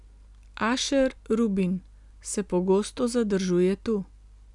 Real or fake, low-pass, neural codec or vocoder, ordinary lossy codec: real; 10.8 kHz; none; none